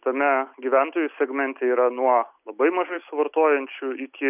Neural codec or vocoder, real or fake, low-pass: none; real; 3.6 kHz